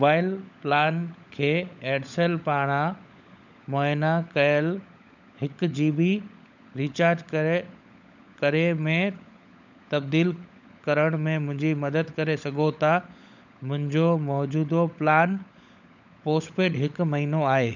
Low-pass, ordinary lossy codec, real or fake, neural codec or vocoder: 7.2 kHz; none; fake; codec, 16 kHz, 16 kbps, FunCodec, trained on LibriTTS, 50 frames a second